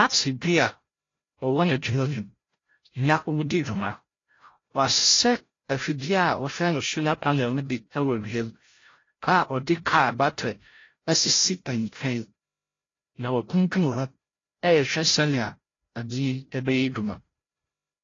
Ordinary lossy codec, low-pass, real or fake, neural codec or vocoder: AAC, 32 kbps; 7.2 kHz; fake; codec, 16 kHz, 0.5 kbps, FreqCodec, larger model